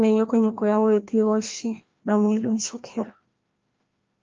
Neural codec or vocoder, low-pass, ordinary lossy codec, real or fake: codec, 16 kHz, 1 kbps, FreqCodec, larger model; 7.2 kHz; Opus, 32 kbps; fake